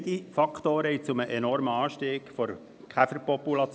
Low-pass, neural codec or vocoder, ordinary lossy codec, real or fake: none; none; none; real